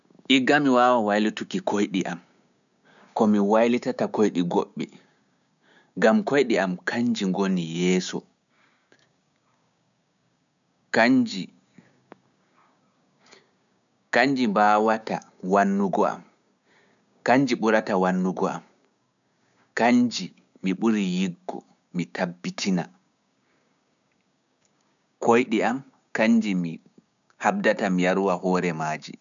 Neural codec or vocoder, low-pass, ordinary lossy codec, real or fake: codec, 16 kHz, 6 kbps, DAC; 7.2 kHz; none; fake